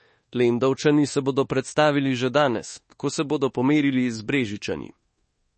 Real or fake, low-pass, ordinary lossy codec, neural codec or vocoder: fake; 10.8 kHz; MP3, 32 kbps; codec, 24 kHz, 1.2 kbps, DualCodec